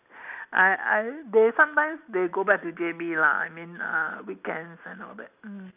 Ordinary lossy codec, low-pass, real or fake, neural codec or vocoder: none; 3.6 kHz; real; none